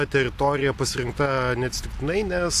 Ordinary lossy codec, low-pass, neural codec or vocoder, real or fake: AAC, 64 kbps; 14.4 kHz; none; real